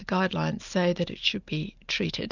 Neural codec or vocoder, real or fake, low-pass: none; real; 7.2 kHz